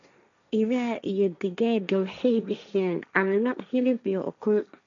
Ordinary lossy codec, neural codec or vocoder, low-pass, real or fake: none; codec, 16 kHz, 1.1 kbps, Voila-Tokenizer; 7.2 kHz; fake